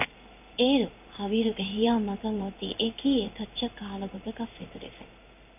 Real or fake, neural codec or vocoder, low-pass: fake; codec, 16 kHz in and 24 kHz out, 1 kbps, XY-Tokenizer; 3.6 kHz